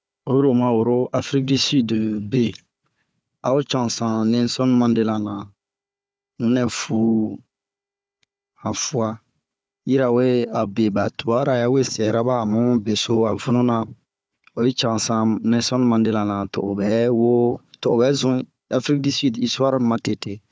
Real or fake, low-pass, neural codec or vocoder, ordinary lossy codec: fake; none; codec, 16 kHz, 4 kbps, FunCodec, trained on Chinese and English, 50 frames a second; none